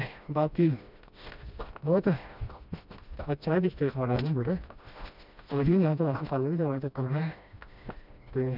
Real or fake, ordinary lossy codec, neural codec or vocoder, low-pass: fake; none; codec, 16 kHz, 1 kbps, FreqCodec, smaller model; 5.4 kHz